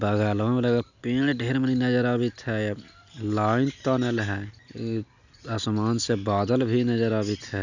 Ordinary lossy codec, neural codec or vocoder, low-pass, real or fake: none; none; 7.2 kHz; real